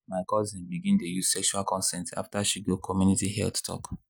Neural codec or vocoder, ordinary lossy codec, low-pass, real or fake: none; none; none; real